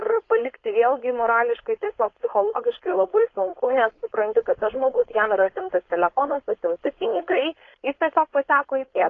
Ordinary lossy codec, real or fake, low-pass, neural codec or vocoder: AAC, 32 kbps; fake; 7.2 kHz; codec, 16 kHz, 4.8 kbps, FACodec